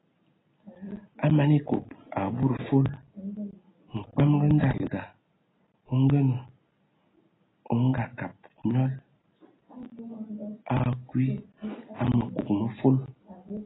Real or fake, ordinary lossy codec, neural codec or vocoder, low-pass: real; AAC, 16 kbps; none; 7.2 kHz